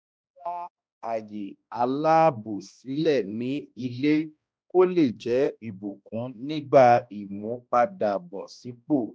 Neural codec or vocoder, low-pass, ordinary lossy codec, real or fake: codec, 16 kHz, 1 kbps, X-Codec, HuBERT features, trained on balanced general audio; none; none; fake